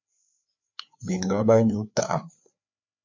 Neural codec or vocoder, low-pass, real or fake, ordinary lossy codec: codec, 16 kHz, 4 kbps, FreqCodec, larger model; 7.2 kHz; fake; MP3, 64 kbps